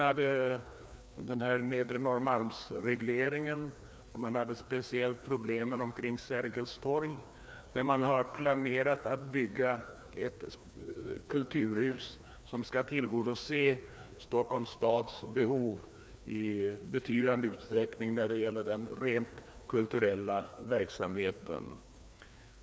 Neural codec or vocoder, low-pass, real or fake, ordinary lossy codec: codec, 16 kHz, 2 kbps, FreqCodec, larger model; none; fake; none